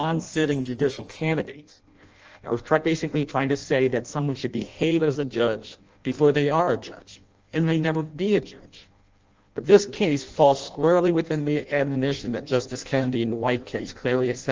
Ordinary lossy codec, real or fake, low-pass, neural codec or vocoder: Opus, 24 kbps; fake; 7.2 kHz; codec, 16 kHz in and 24 kHz out, 0.6 kbps, FireRedTTS-2 codec